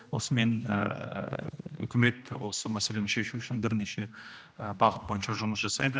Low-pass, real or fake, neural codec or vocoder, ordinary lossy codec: none; fake; codec, 16 kHz, 1 kbps, X-Codec, HuBERT features, trained on general audio; none